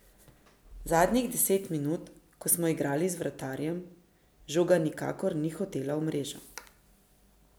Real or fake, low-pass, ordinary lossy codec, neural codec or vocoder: real; none; none; none